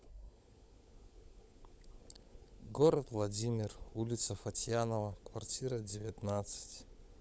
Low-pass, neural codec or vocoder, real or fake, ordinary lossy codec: none; codec, 16 kHz, 16 kbps, FunCodec, trained on LibriTTS, 50 frames a second; fake; none